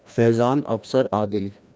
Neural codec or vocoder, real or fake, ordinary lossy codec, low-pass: codec, 16 kHz, 1 kbps, FreqCodec, larger model; fake; none; none